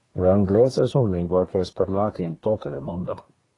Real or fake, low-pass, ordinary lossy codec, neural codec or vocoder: fake; 10.8 kHz; AAC, 32 kbps; codec, 24 kHz, 1 kbps, SNAC